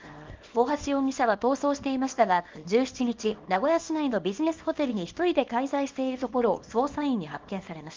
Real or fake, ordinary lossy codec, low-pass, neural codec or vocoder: fake; Opus, 32 kbps; 7.2 kHz; codec, 24 kHz, 0.9 kbps, WavTokenizer, small release